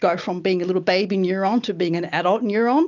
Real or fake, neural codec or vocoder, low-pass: real; none; 7.2 kHz